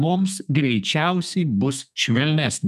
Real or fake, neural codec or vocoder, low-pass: fake; codec, 32 kHz, 1.9 kbps, SNAC; 14.4 kHz